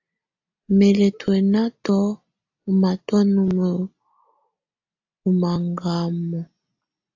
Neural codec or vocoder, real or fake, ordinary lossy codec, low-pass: none; real; AAC, 48 kbps; 7.2 kHz